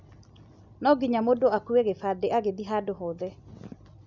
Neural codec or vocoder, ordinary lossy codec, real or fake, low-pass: none; none; real; 7.2 kHz